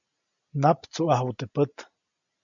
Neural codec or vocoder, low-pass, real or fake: none; 7.2 kHz; real